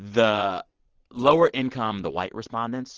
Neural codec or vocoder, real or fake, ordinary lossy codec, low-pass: vocoder, 22.05 kHz, 80 mel bands, WaveNeXt; fake; Opus, 16 kbps; 7.2 kHz